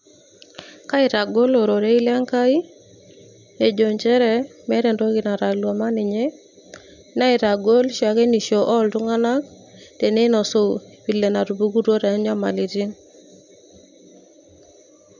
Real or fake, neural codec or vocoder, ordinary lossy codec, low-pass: real; none; none; 7.2 kHz